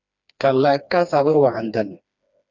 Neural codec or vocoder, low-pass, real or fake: codec, 16 kHz, 2 kbps, FreqCodec, smaller model; 7.2 kHz; fake